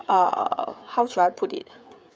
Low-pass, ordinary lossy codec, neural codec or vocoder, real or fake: none; none; codec, 16 kHz, 16 kbps, FreqCodec, smaller model; fake